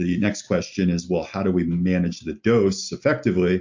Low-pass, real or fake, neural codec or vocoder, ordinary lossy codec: 7.2 kHz; real; none; MP3, 48 kbps